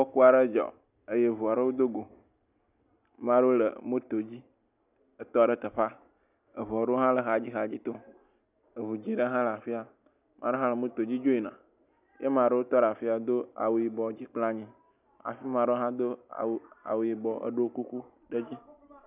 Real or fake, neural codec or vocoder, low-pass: real; none; 3.6 kHz